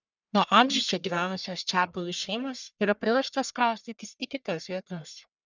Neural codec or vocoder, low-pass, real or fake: codec, 44.1 kHz, 1.7 kbps, Pupu-Codec; 7.2 kHz; fake